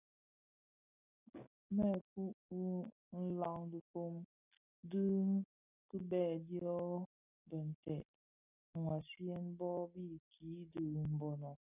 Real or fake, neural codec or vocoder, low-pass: real; none; 3.6 kHz